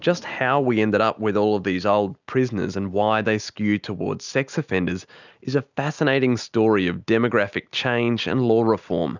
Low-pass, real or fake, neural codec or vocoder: 7.2 kHz; real; none